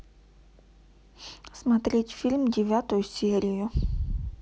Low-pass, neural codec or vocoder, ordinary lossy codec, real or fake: none; none; none; real